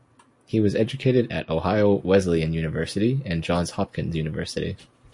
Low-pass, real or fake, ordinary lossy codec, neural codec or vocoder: 10.8 kHz; real; MP3, 48 kbps; none